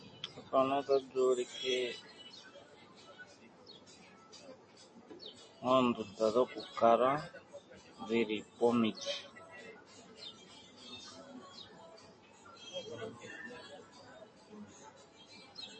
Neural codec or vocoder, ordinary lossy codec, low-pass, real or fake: none; MP3, 32 kbps; 9.9 kHz; real